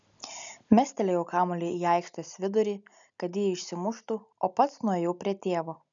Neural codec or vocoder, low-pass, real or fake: none; 7.2 kHz; real